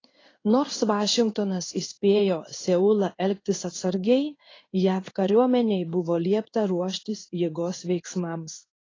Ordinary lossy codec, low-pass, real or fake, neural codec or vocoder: AAC, 32 kbps; 7.2 kHz; fake; codec, 16 kHz in and 24 kHz out, 1 kbps, XY-Tokenizer